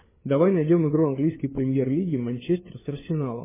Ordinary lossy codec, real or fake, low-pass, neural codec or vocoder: MP3, 16 kbps; fake; 3.6 kHz; codec, 16 kHz, 4 kbps, FunCodec, trained on LibriTTS, 50 frames a second